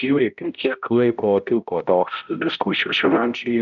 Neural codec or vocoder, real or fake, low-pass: codec, 16 kHz, 0.5 kbps, X-Codec, HuBERT features, trained on balanced general audio; fake; 7.2 kHz